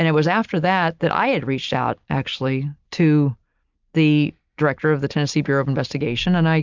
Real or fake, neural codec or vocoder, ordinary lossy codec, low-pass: real; none; MP3, 64 kbps; 7.2 kHz